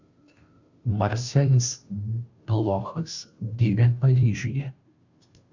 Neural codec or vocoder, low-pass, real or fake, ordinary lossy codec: codec, 16 kHz, 0.5 kbps, FunCodec, trained on Chinese and English, 25 frames a second; 7.2 kHz; fake; Opus, 64 kbps